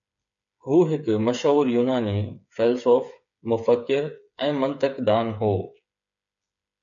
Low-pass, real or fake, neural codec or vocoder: 7.2 kHz; fake; codec, 16 kHz, 8 kbps, FreqCodec, smaller model